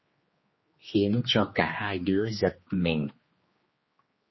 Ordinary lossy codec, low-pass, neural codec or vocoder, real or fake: MP3, 24 kbps; 7.2 kHz; codec, 16 kHz, 2 kbps, X-Codec, HuBERT features, trained on general audio; fake